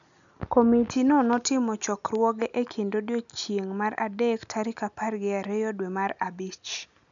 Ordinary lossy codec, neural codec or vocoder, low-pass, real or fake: none; none; 7.2 kHz; real